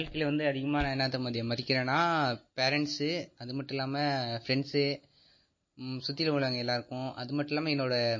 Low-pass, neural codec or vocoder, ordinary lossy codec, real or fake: 7.2 kHz; none; MP3, 32 kbps; real